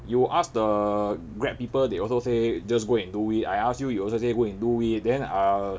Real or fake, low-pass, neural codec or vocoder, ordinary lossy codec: real; none; none; none